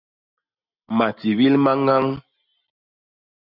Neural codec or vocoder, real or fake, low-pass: none; real; 5.4 kHz